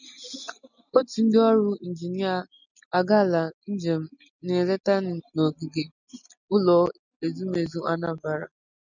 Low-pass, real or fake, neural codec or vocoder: 7.2 kHz; real; none